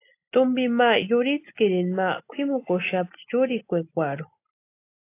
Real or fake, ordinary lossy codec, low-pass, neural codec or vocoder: real; AAC, 24 kbps; 3.6 kHz; none